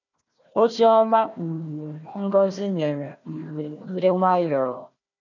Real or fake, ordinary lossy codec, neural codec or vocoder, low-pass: fake; none; codec, 16 kHz, 1 kbps, FunCodec, trained on Chinese and English, 50 frames a second; 7.2 kHz